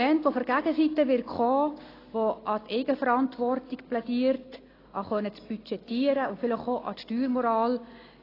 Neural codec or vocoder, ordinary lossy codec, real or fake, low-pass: none; AAC, 24 kbps; real; 5.4 kHz